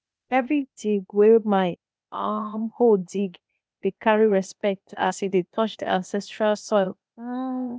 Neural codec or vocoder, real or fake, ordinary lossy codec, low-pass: codec, 16 kHz, 0.8 kbps, ZipCodec; fake; none; none